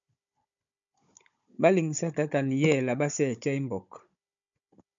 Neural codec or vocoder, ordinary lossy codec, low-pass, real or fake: codec, 16 kHz, 16 kbps, FunCodec, trained on Chinese and English, 50 frames a second; MP3, 64 kbps; 7.2 kHz; fake